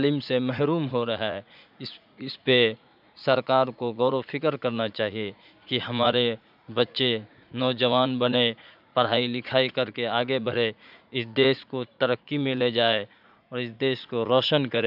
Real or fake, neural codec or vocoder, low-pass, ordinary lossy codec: fake; vocoder, 44.1 kHz, 80 mel bands, Vocos; 5.4 kHz; none